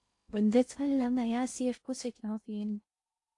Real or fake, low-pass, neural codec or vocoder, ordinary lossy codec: fake; 10.8 kHz; codec, 16 kHz in and 24 kHz out, 0.6 kbps, FocalCodec, streaming, 2048 codes; AAC, 48 kbps